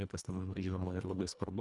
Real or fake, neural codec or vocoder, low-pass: fake; codec, 24 kHz, 1.5 kbps, HILCodec; 10.8 kHz